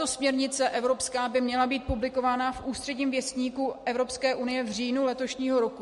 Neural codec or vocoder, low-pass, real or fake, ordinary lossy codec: none; 14.4 kHz; real; MP3, 48 kbps